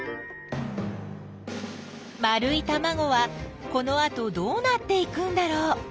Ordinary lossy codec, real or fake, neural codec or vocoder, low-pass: none; real; none; none